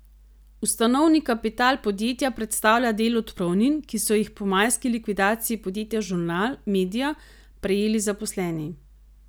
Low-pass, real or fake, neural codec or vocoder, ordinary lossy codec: none; real; none; none